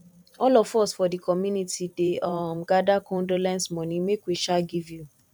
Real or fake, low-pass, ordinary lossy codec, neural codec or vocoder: fake; 19.8 kHz; none; vocoder, 44.1 kHz, 128 mel bands every 512 samples, BigVGAN v2